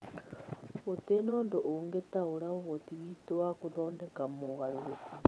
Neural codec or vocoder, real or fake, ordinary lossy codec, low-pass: vocoder, 22.05 kHz, 80 mel bands, WaveNeXt; fake; none; none